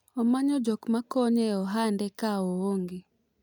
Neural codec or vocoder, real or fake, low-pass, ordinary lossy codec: none; real; 19.8 kHz; none